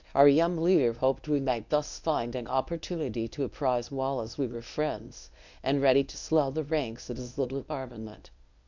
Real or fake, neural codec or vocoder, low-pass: fake; codec, 24 kHz, 0.9 kbps, WavTokenizer, medium speech release version 1; 7.2 kHz